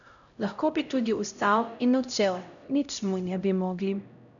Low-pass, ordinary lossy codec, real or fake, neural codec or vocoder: 7.2 kHz; none; fake; codec, 16 kHz, 0.5 kbps, X-Codec, HuBERT features, trained on LibriSpeech